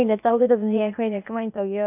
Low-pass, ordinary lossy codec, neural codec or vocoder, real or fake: 3.6 kHz; none; codec, 16 kHz, 0.8 kbps, ZipCodec; fake